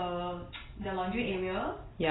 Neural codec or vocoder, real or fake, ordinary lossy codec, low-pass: none; real; AAC, 16 kbps; 7.2 kHz